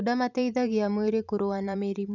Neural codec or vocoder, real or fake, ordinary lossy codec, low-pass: vocoder, 44.1 kHz, 128 mel bands every 512 samples, BigVGAN v2; fake; none; 7.2 kHz